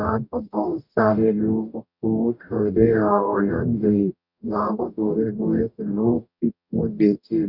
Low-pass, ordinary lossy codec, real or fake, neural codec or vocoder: 5.4 kHz; none; fake; codec, 44.1 kHz, 0.9 kbps, DAC